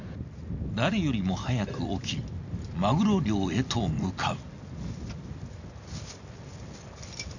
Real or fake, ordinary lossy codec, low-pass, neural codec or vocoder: real; none; 7.2 kHz; none